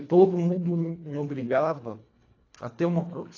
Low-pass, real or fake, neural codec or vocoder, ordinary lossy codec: 7.2 kHz; fake; codec, 24 kHz, 1.5 kbps, HILCodec; AAC, 32 kbps